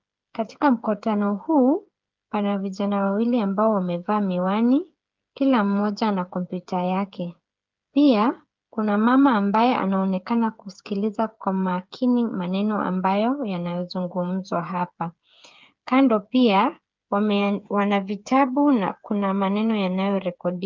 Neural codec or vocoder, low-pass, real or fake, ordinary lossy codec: codec, 16 kHz, 8 kbps, FreqCodec, smaller model; 7.2 kHz; fake; Opus, 24 kbps